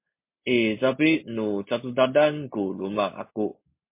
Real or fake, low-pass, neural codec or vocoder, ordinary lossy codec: real; 3.6 kHz; none; MP3, 24 kbps